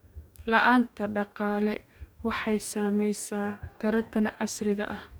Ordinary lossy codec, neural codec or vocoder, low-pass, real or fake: none; codec, 44.1 kHz, 2.6 kbps, DAC; none; fake